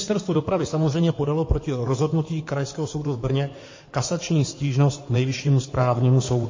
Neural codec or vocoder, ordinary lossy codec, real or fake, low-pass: codec, 16 kHz in and 24 kHz out, 2.2 kbps, FireRedTTS-2 codec; MP3, 32 kbps; fake; 7.2 kHz